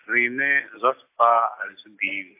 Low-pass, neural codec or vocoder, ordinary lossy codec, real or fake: 3.6 kHz; none; AAC, 24 kbps; real